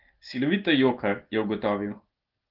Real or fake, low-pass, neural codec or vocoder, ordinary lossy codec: real; 5.4 kHz; none; Opus, 16 kbps